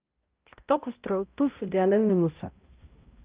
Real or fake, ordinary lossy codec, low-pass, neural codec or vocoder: fake; Opus, 32 kbps; 3.6 kHz; codec, 16 kHz, 0.5 kbps, X-Codec, HuBERT features, trained on balanced general audio